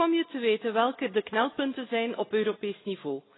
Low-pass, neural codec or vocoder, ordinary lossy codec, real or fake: 7.2 kHz; none; AAC, 16 kbps; real